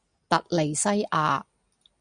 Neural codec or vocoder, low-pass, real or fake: none; 9.9 kHz; real